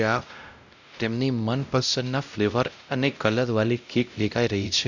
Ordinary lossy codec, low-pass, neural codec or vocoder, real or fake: none; 7.2 kHz; codec, 16 kHz, 0.5 kbps, X-Codec, WavLM features, trained on Multilingual LibriSpeech; fake